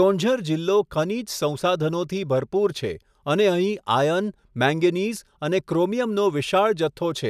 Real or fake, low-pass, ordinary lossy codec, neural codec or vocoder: real; 14.4 kHz; none; none